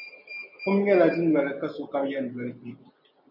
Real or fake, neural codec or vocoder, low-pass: real; none; 5.4 kHz